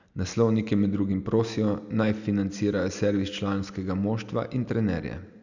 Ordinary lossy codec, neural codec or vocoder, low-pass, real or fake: none; none; 7.2 kHz; real